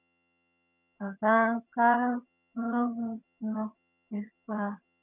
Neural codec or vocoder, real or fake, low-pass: vocoder, 22.05 kHz, 80 mel bands, HiFi-GAN; fake; 3.6 kHz